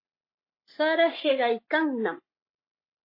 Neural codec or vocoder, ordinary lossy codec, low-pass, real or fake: codec, 44.1 kHz, 7.8 kbps, Pupu-Codec; MP3, 24 kbps; 5.4 kHz; fake